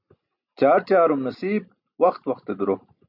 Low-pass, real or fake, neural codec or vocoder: 5.4 kHz; real; none